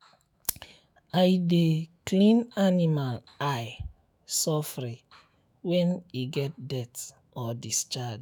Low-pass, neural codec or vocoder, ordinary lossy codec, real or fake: none; autoencoder, 48 kHz, 128 numbers a frame, DAC-VAE, trained on Japanese speech; none; fake